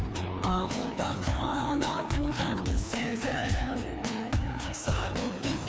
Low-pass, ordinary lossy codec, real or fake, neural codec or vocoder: none; none; fake; codec, 16 kHz, 2 kbps, FreqCodec, larger model